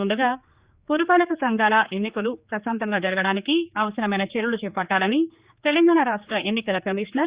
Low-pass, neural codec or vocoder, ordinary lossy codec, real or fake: 3.6 kHz; codec, 16 kHz, 4 kbps, X-Codec, HuBERT features, trained on general audio; Opus, 64 kbps; fake